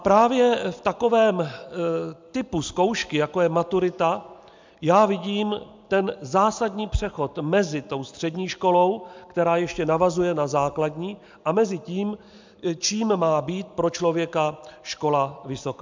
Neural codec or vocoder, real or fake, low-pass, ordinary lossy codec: none; real; 7.2 kHz; MP3, 64 kbps